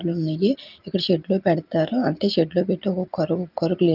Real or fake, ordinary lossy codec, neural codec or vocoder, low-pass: real; Opus, 24 kbps; none; 5.4 kHz